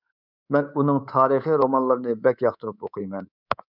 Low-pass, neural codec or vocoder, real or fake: 5.4 kHz; codec, 24 kHz, 3.1 kbps, DualCodec; fake